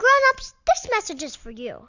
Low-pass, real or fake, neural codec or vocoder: 7.2 kHz; real; none